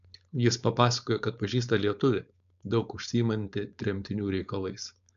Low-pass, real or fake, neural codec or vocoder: 7.2 kHz; fake; codec, 16 kHz, 4.8 kbps, FACodec